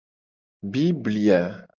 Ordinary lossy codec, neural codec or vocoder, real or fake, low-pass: Opus, 32 kbps; none; real; 7.2 kHz